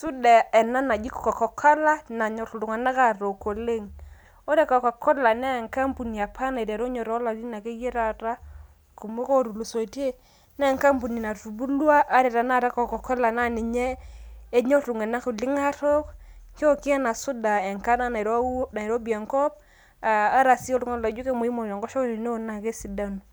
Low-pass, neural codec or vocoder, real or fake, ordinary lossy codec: none; none; real; none